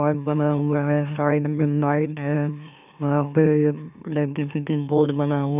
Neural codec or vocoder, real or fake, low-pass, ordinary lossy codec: autoencoder, 44.1 kHz, a latent of 192 numbers a frame, MeloTTS; fake; 3.6 kHz; none